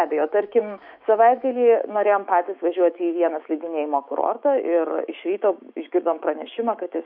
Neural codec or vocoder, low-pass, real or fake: autoencoder, 48 kHz, 128 numbers a frame, DAC-VAE, trained on Japanese speech; 5.4 kHz; fake